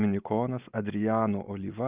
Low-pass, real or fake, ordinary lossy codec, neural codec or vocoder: 3.6 kHz; real; Opus, 24 kbps; none